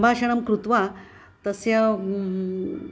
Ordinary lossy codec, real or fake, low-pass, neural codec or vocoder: none; real; none; none